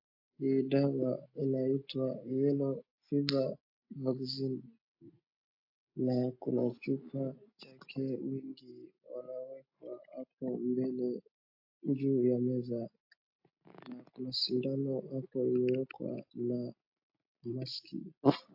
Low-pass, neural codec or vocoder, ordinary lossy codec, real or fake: 5.4 kHz; none; AAC, 48 kbps; real